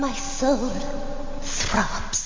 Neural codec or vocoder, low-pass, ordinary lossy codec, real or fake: none; 7.2 kHz; MP3, 48 kbps; real